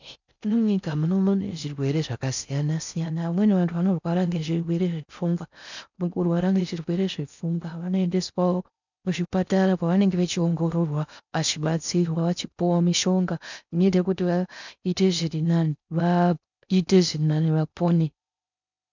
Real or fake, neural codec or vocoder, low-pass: fake; codec, 16 kHz in and 24 kHz out, 0.6 kbps, FocalCodec, streaming, 4096 codes; 7.2 kHz